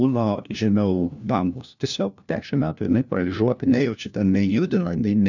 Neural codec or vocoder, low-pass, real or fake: codec, 16 kHz, 1 kbps, FunCodec, trained on LibriTTS, 50 frames a second; 7.2 kHz; fake